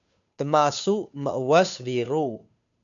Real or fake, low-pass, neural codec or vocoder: fake; 7.2 kHz; codec, 16 kHz, 2 kbps, FunCodec, trained on Chinese and English, 25 frames a second